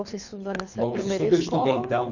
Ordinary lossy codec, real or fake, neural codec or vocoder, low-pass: none; fake; codec, 24 kHz, 3 kbps, HILCodec; 7.2 kHz